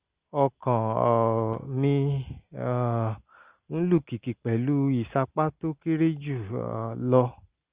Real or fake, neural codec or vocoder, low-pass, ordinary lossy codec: real; none; 3.6 kHz; Opus, 24 kbps